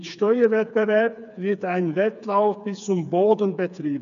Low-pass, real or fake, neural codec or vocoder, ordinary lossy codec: 7.2 kHz; fake; codec, 16 kHz, 4 kbps, FreqCodec, smaller model; none